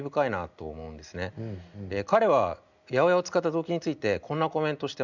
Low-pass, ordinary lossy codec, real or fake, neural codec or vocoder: 7.2 kHz; none; real; none